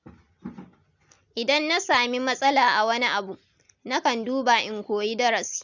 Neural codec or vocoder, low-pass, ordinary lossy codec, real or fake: none; 7.2 kHz; none; real